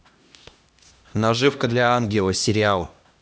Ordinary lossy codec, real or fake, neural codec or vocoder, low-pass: none; fake; codec, 16 kHz, 1 kbps, X-Codec, HuBERT features, trained on LibriSpeech; none